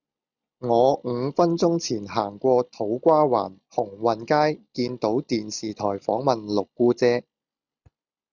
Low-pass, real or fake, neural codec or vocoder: 7.2 kHz; real; none